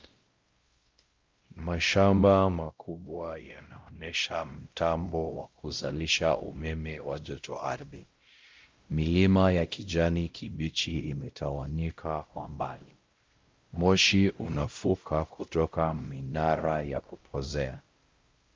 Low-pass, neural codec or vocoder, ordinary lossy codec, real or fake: 7.2 kHz; codec, 16 kHz, 0.5 kbps, X-Codec, WavLM features, trained on Multilingual LibriSpeech; Opus, 32 kbps; fake